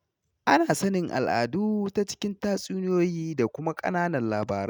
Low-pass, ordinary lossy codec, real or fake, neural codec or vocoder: none; none; real; none